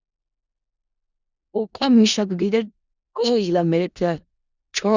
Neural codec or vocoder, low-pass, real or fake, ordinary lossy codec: codec, 16 kHz in and 24 kHz out, 0.4 kbps, LongCat-Audio-Codec, four codebook decoder; 7.2 kHz; fake; Opus, 64 kbps